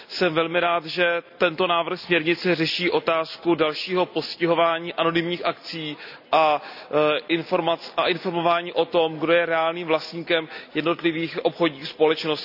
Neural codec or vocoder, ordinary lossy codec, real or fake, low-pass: none; none; real; 5.4 kHz